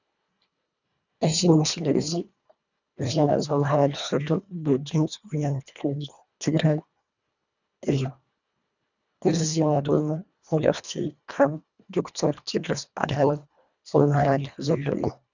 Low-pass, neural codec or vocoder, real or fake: 7.2 kHz; codec, 24 kHz, 1.5 kbps, HILCodec; fake